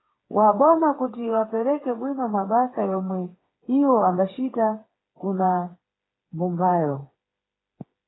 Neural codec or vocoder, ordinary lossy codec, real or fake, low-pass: codec, 16 kHz, 4 kbps, FreqCodec, smaller model; AAC, 16 kbps; fake; 7.2 kHz